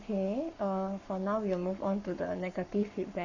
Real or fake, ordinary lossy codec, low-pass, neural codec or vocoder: fake; none; 7.2 kHz; codec, 44.1 kHz, 7.8 kbps, Pupu-Codec